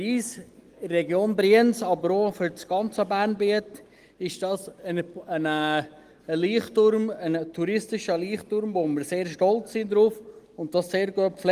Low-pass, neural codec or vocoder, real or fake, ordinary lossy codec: 14.4 kHz; none; real; Opus, 24 kbps